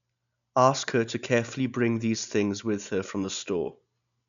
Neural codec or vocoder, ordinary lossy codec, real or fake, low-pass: none; none; real; 7.2 kHz